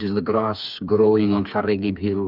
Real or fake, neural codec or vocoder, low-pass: fake; codec, 16 kHz, 4 kbps, FreqCodec, smaller model; 5.4 kHz